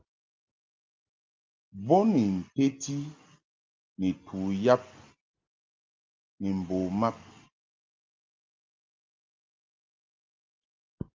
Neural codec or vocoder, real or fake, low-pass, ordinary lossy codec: none; real; 7.2 kHz; Opus, 32 kbps